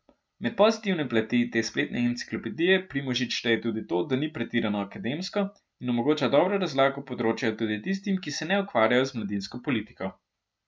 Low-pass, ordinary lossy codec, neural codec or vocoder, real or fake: none; none; none; real